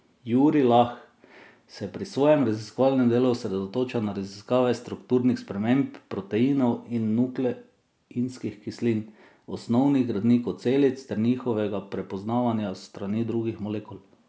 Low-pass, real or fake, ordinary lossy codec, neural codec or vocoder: none; real; none; none